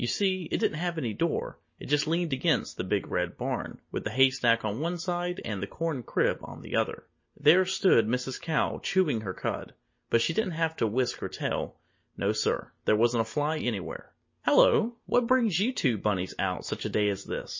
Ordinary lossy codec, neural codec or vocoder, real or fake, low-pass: MP3, 32 kbps; none; real; 7.2 kHz